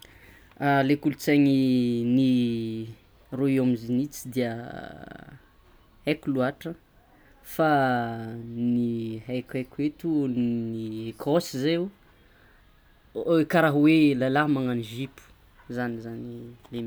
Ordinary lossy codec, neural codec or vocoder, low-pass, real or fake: none; none; none; real